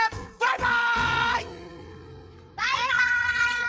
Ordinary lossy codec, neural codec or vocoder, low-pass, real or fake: none; codec, 16 kHz, 8 kbps, FreqCodec, larger model; none; fake